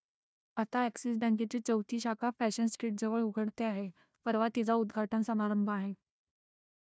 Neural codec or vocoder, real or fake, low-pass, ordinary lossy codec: codec, 16 kHz, 1 kbps, FunCodec, trained on Chinese and English, 50 frames a second; fake; none; none